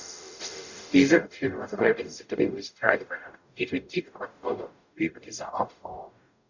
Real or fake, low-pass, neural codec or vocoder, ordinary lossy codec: fake; 7.2 kHz; codec, 44.1 kHz, 0.9 kbps, DAC; none